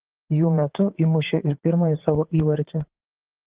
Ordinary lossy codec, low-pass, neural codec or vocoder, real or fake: Opus, 16 kbps; 3.6 kHz; vocoder, 44.1 kHz, 80 mel bands, Vocos; fake